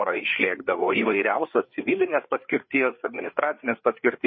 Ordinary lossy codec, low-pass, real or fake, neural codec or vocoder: MP3, 24 kbps; 7.2 kHz; fake; codec, 16 kHz, 4 kbps, FreqCodec, larger model